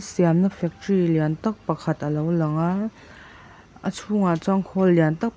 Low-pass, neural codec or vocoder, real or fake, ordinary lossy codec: none; none; real; none